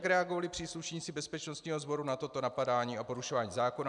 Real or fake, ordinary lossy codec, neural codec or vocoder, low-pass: real; MP3, 96 kbps; none; 10.8 kHz